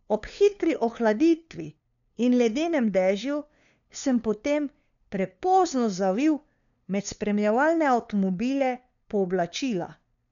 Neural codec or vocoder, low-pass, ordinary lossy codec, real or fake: codec, 16 kHz, 2 kbps, FunCodec, trained on LibriTTS, 25 frames a second; 7.2 kHz; none; fake